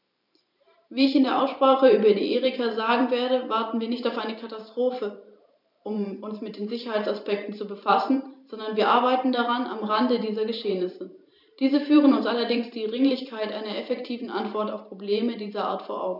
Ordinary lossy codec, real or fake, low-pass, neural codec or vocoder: none; fake; 5.4 kHz; vocoder, 44.1 kHz, 128 mel bands every 512 samples, BigVGAN v2